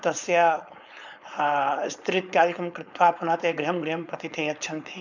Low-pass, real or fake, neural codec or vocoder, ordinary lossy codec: 7.2 kHz; fake; codec, 16 kHz, 4.8 kbps, FACodec; none